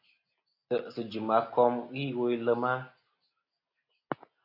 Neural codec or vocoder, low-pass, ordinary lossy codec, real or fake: none; 5.4 kHz; AAC, 32 kbps; real